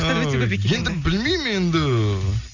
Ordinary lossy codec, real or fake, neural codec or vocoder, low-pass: none; real; none; 7.2 kHz